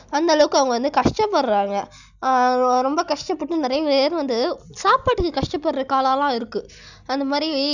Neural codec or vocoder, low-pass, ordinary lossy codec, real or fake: none; 7.2 kHz; none; real